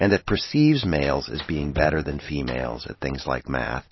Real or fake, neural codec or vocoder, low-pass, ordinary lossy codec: real; none; 7.2 kHz; MP3, 24 kbps